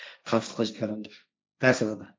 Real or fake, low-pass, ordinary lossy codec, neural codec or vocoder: fake; none; none; codec, 16 kHz, 1.1 kbps, Voila-Tokenizer